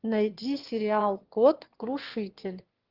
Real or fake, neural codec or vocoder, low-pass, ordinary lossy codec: fake; autoencoder, 22.05 kHz, a latent of 192 numbers a frame, VITS, trained on one speaker; 5.4 kHz; Opus, 16 kbps